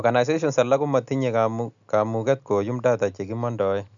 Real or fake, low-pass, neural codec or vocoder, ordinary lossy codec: real; 7.2 kHz; none; MP3, 96 kbps